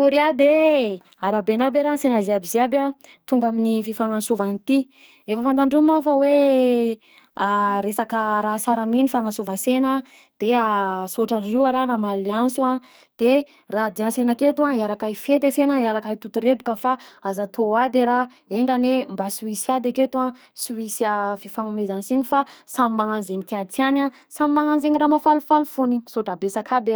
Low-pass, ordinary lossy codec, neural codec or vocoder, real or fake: none; none; codec, 44.1 kHz, 2.6 kbps, SNAC; fake